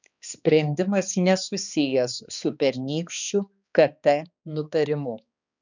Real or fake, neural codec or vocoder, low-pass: fake; codec, 16 kHz, 2 kbps, X-Codec, HuBERT features, trained on balanced general audio; 7.2 kHz